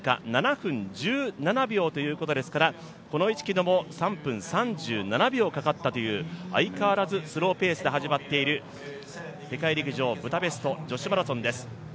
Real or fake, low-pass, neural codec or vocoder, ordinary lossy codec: real; none; none; none